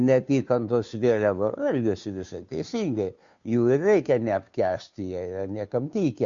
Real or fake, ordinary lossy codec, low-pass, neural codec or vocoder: fake; MP3, 64 kbps; 7.2 kHz; codec, 16 kHz, 2 kbps, FunCodec, trained on Chinese and English, 25 frames a second